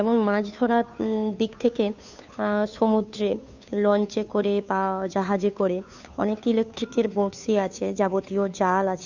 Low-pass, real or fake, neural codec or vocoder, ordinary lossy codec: 7.2 kHz; fake; codec, 16 kHz, 2 kbps, FunCodec, trained on Chinese and English, 25 frames a second; none